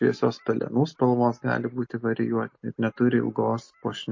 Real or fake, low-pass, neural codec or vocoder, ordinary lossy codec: real; 7.2 kHz; none; MP3, 32 kbps